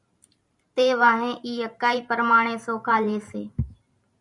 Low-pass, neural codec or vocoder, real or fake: 10.8 kHz; vocoder, 44.1 kHz, 128 mel bands every 512 samples, BigVGAN v2; fake